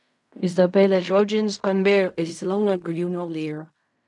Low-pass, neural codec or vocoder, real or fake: 10.8 kHz; codec, 16 kHz in and 24 kHz out, 0.4 kbps, LongCat-Audio-Codec, fine tuned four codebook decoder; fake